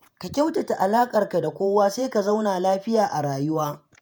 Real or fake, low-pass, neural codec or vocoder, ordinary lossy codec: fake; none; vocoder, 48 kHz, 128 mel bands, Vocos; none